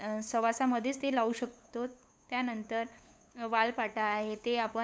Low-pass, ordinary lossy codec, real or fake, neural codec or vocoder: none; none; fake; codec, 16 kHz, 8 kbps, FunCodec, trained on LibriTTS, 25 frames a second